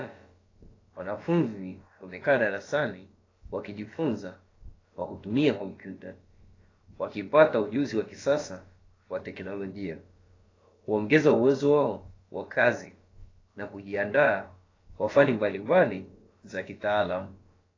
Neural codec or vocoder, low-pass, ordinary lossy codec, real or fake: codec, 16 kHz, about 1 kbps, DyCAST, with the encoder's durations; 7.2 kHz; AAC, 32 kbps; fake